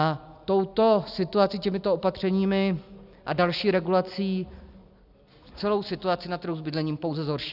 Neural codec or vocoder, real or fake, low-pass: none; real; 5.4 kHz